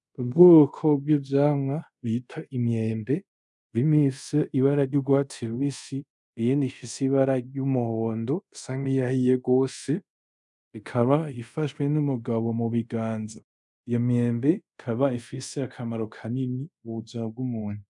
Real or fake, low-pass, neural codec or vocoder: fake; 10.8 kHz; codec, 24 kHz, 0.5 kbps, DualCodec